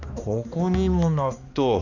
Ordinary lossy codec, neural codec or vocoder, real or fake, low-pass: none; codec, 16 kHz, 2 kbps, X-Codec, HuBERT features, trained on balanced general audio; fake; 7.2 kHz